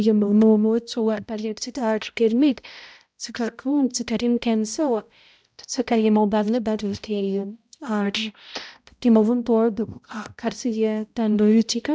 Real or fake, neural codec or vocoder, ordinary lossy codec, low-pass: fake; codec, 16 kHz, 0.5 kbps, X-Codec, HuBERT features, trained on balanced general audio; none; none